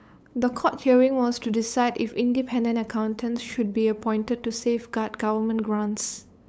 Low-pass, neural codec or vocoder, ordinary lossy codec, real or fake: none; codec, 16 kHz, 8 kbps, FunCodec, trained on LibriTTS, 25 frames a second; none; fake